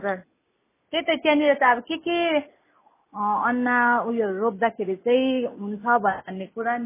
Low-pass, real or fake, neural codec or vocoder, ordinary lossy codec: 3.6 kHz; real; none; MP3, 16 kbps